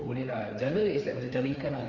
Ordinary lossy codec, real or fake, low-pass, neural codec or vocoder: Opus, 64 kbps; fake; 7.2 kHz; codec, 16 kHz, 4 kbps, FreqCodec, larger model